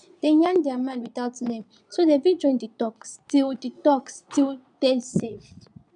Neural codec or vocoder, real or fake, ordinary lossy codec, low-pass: vocoder, 22.05 kHz, 80 mel bands, Vocos; fake; none; 9.9 kHz